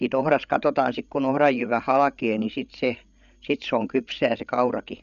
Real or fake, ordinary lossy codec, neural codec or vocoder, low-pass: fake; none; codec, 16 kHz, 8 kbps, FreqCodec, larger model; 7.2 kHz